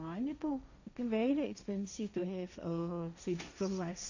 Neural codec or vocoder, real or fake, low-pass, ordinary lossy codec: codec, 16 kHz, 1.1 kbps, Voila-Tokenizer; fake; 7.2 kHz; none